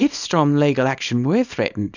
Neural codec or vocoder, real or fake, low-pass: codec, 24 kHz, 0.9 kbps, WavTokenizer, small release; fake; 7.2 kHz